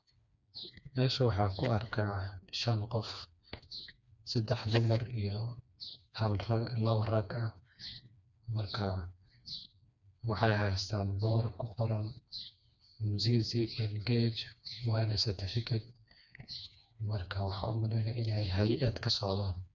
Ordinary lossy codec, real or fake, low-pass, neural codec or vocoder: none; fake; 7.2 kHz; codec, 16 kHz, 2 kbps, FreqCodec, smaller model